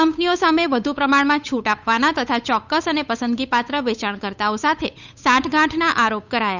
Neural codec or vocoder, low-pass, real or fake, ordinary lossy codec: codec, 16 kHz, 8 kbps, FunCodec, trained on Chinese and English, 25 frames a second; 7.2 kHz; fake; none